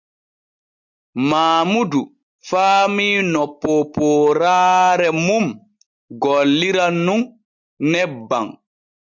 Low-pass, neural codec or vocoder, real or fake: 7.2 kHz; none; real